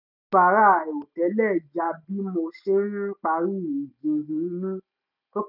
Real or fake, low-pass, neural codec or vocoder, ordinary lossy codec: real; 5.4 kHz; none; none